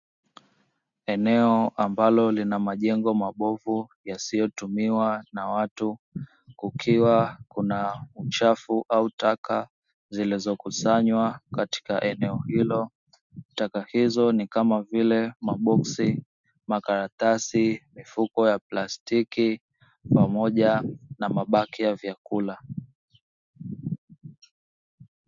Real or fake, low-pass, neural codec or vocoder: real; 7.2 kHz; none